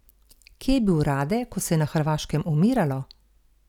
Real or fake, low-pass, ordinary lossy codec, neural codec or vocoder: real; 19.8 kHz; none; none